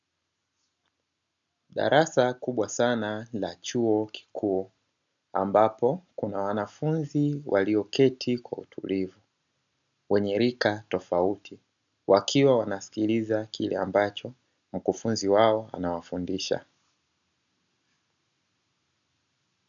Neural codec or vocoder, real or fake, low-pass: none; real; 7.2 kHz